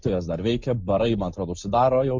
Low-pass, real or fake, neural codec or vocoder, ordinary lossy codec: 7.2 kHz; real; none; MP3, 64 kbps